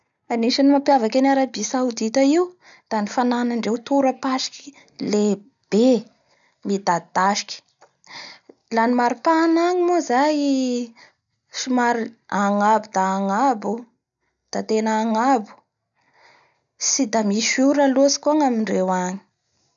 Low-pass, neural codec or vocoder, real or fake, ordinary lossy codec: 7.2 kHz; none; real; none